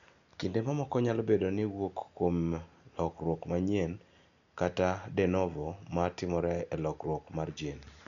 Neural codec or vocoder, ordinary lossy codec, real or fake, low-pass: none; none; real; 7.2 kHz